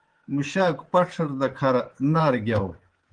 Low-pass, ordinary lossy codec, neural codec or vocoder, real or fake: 9.9 kHz; Opus, 16 kbps; codec, 24 kHz, 3.1 kbps, DualCodec; fake